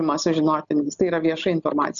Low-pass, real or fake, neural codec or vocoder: 7.2 kHz; real; none